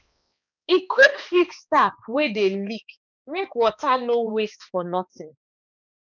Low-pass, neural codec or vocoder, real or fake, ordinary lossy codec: 7.2 kHz; codec, 16 kHz, 2 kbps, X-Codec, HuBERT features, trained on balanced general audio; fake; none